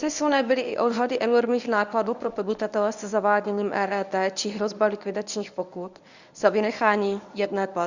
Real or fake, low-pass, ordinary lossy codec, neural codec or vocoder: fake; 7.2 kHz; Opus, 64 kbps; codec, 24 kHz, 0.9 kbps, WavTokenizer, medium speech release version 1